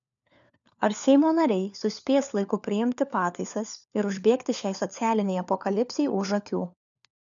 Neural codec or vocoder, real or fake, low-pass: codec, 16 kHz, 4 kbps, FunCodec, trained on LibriTTS, 50 frames a second; fake; 7.2 kHz